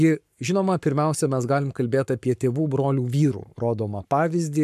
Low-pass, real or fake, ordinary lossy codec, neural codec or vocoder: 14.4 kHz; fake; AAC, 96 kbps; codec, 44.1 kHz, 7.8 kbps, Pupu-Codec